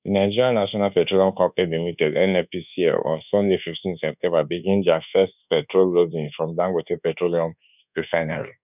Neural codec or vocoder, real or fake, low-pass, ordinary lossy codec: codec, 24 kHz, 1.2 kbps, DualCodec; fake; 3.6 kHz; none